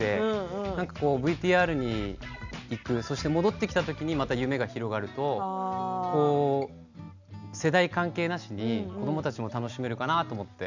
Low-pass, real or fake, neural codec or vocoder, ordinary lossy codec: 7.2 kHz; real; none; none